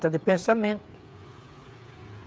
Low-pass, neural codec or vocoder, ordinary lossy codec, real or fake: none; codec, 16 kHz, 8 kbps, FreqCodec, smaller model; none; fake